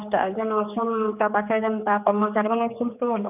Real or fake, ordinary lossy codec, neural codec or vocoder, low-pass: fake; none; codec, 16 kHz, 4 kbps, X-Codec, HuBERT features, trained on general audio; 3.6 kHz